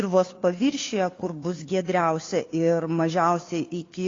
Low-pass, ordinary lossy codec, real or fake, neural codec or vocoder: 7.2 kHz; AAC, 32 kbps; fake; codec, 16 kHz, 2 kbps, FunCodec, trained on Chinese and English, 25 frames a second